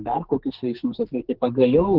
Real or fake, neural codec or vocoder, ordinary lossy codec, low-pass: fake; codec, 44.1 kHz, 2.6 kbps, SNAC; Opus, 16 kbps; 5.4 kHz